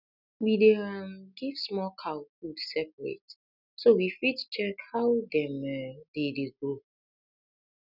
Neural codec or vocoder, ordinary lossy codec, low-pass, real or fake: none; none; 5.4 kHz; real